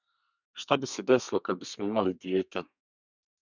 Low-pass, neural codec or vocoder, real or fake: 7.2 kHz; codec, 32 kHz, 1.9 kbps, SNAC; fake